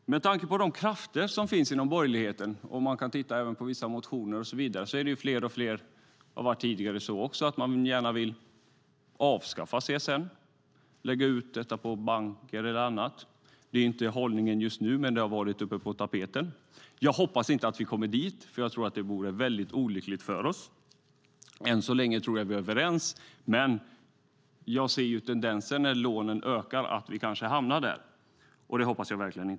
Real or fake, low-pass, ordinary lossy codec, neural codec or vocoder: real; none; none; none